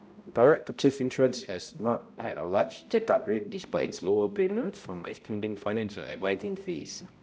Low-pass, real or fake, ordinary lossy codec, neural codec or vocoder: none; fake; none; codec, 16 kHz, 0.5 kbps, X-Codec, HuBERT features, trained on balanced general audio